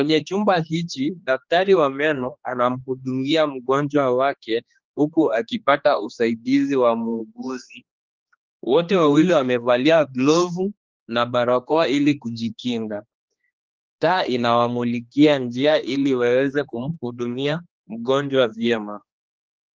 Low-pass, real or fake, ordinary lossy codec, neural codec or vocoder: 7.2 kHz; fake; Opus, 24 kbps; codec, 16 kHz, 2 kbps, X-Codec, HuBERT features, trained on general audio